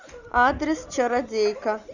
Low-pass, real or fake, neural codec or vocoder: 7.2 kHz; real; none